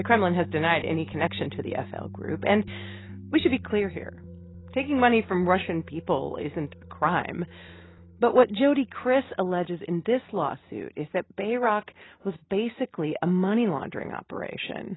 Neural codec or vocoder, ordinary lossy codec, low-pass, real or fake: none; AAC, 16 kbps; 7.2 kHz; real